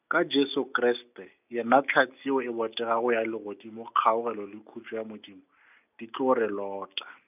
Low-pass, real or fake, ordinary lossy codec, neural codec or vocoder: 3.6 kHz; real; none; none